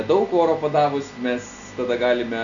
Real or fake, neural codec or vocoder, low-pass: real; none; 7.2 kHz